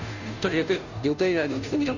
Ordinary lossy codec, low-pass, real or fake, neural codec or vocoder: none; 7.2 kHz; fake; codec, 16 kHz, 0.5 kbps, FunCodec, trained on Chinese and English, 25 frames a second